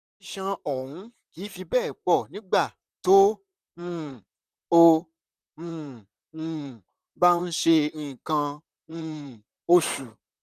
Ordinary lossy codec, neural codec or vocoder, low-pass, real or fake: none; vocoder, 44.1 kHz, 128 mel bands, Pupu-Vocoder; 14.4 kHz; fake